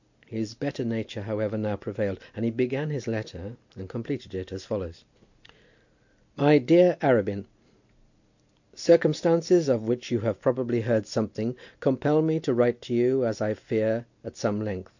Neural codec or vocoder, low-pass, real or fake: none; 7.2 kHz; real